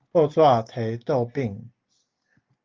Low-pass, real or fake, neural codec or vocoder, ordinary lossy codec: 7.2 kHz; real; none; Opus, 32 kbps